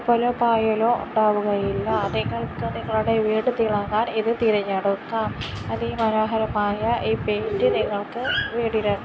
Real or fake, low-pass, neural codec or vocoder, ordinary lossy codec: real; none; none; none